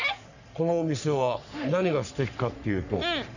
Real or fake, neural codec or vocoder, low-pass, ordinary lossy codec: fake; codec, 44.1 kHz, 3.4 kbps, Pupu-Codec; 7.2 kHz; none